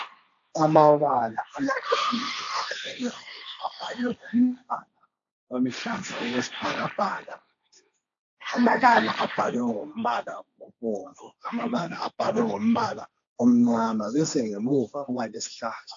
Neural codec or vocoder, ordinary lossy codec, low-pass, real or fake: codec, 16 kHz, 1.1 kbps, Voila-Tokenizer; AAC, 64 kbps; 7.2 kHz; fake